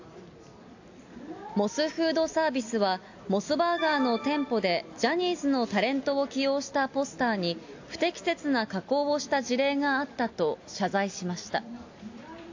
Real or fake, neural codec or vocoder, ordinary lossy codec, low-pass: real; none; AAC, 48 kbps; 7.2 kHz